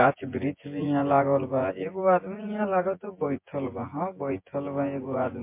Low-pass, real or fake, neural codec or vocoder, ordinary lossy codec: 3.6 kHz; fake; vocoder, 24 kHz, 100 mel bands, Vocos; MP3, 24 kbps